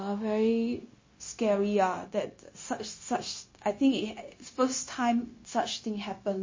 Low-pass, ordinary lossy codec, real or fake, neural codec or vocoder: 7.2 kHz; MP3, 32 kbps; fake; codec, 16 kHz, 0.9 kbps, LongCat-Audio-Codec